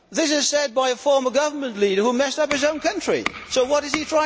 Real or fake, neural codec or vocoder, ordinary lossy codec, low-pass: real; none; none; none